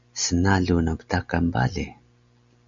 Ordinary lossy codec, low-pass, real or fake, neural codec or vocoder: Opus, 64 kbps; 7.2 kHz; real; none